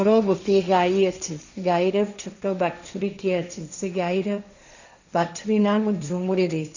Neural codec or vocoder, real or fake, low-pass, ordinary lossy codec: codec, 16 kHz, 1.1 kbps, Voila-Tokenizer; fake; 7.2 kHz; none